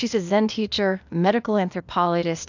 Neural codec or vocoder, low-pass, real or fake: codec, 16 kHz, 0.8 kbps, ZipCodec; 7.2 kHz; fake